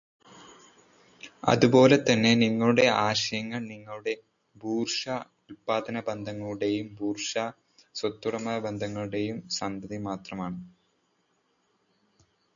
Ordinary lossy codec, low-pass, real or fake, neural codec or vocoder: MP3, 96 kbps; 7.2 kHz; real; none